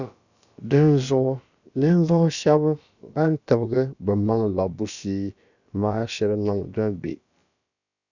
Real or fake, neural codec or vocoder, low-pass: fake; codec, 16 kHz, about 1 kbps, DyCAST, with the encoder's durations; 7.2 kHz